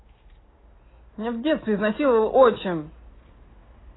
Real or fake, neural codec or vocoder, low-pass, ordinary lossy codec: real; none; 7.2 kHz; AAC, 16 kbps